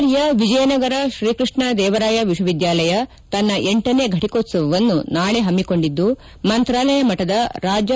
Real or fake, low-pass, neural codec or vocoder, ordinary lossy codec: real; none; none; none